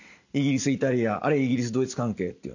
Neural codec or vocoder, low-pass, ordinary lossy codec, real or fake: none; 7.2 kHz; none; real